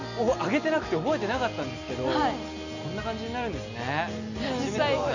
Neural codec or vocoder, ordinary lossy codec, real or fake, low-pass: none; none; real; 7.2 kHz